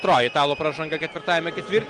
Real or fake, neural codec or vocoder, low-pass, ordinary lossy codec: real; none; 10.8 kHz; Opus, 24 kbps